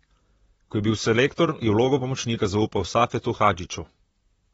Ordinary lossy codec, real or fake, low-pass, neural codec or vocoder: AAC, 24 kbps; fake; 19.8 kHz; vocoder, 44.1 kHz, 128 mel bands, Pupu-Vocoder